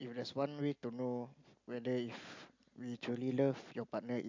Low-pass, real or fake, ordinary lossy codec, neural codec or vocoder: 7.2 kHz; real; MP3, 64 kbps; none